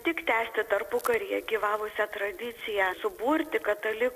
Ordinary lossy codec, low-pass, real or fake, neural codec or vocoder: AAC, 96 kbps; 14.4 kHz; real; none